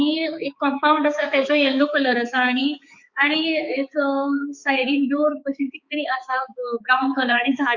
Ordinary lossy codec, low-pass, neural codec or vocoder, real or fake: Opus, 64 kbps; 7.2 kHz; codec, 16 kHz, 4 kbps, X-Codec, HuBERT features, trained on balanced general audio; fake